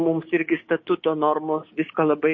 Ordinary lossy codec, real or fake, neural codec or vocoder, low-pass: MP3, 32 kbps; fake; codec, 16 kHz, 6 kbps, DAC; 7.2 kHz